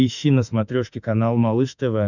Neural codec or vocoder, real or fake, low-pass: autoencoder, 48 kHz, 32 numbers a frame, DAC-VAE, trained on Japanese speech; fake; 7.2 kHz